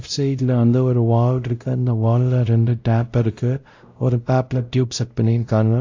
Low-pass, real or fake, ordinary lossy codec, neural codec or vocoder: 7.2 kHz; fake; AAC, 48 kbps; codec, 16 kHz, 0.5 kbps, X-Codec, WavLM features, trained on Multilingual LibriSpeech